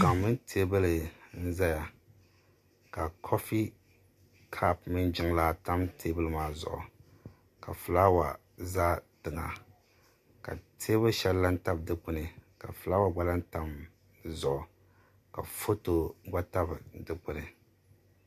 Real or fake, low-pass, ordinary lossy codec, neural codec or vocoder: real; 14.4 kHz; MP3, 64 kbps; none